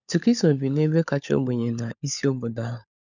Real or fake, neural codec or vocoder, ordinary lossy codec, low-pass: fake; codec, 16 kHz, 16 kbps, FunCodec, trained on LibriTTS, 50 frames a second; none; 7.2 kHz